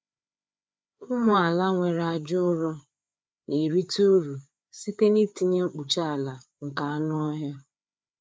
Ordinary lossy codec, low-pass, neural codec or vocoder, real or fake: none; none; codec, 16 kHz, 4 kbps, FreqCodec, larger model; fake